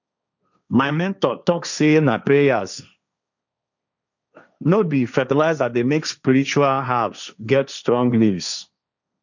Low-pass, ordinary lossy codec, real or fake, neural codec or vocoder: 7.2 kHz; none; fake; codec, 16 kHz, 1.1 kbps, Voila-Tokenizer